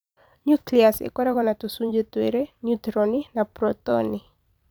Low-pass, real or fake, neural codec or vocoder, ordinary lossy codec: none; fake; vocoder, 44.1 kHz, 128 mel bands every 256 samples, BigVGAN v2; none